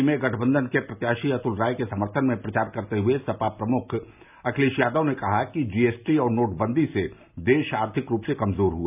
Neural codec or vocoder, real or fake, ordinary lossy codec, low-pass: none; real; none; 3.6 kHz